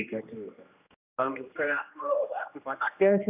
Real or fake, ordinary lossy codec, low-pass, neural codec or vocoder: fake; none; 3.6 kHz; codec, 16 kHz, 1 kbps, X-Codec, HuBERT features, trained on general audio